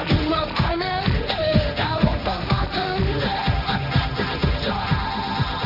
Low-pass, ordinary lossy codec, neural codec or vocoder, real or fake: 5.4 kHz; none; codec, 16 kHz, 1.1 kbps, Voila-Tokenizer; fake